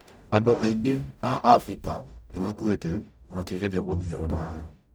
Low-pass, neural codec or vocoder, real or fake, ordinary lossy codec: none; codec, 44.1 kHz, 0.9 kbps, DAC; fake; none